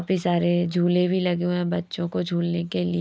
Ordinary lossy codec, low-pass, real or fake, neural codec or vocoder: none; none; real; none